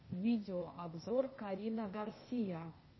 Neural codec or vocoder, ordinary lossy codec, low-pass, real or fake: codec, 16 kHz, 0.8 kbps, ZipCodec; MP3, 24 kbps; 7.2 kHz; fake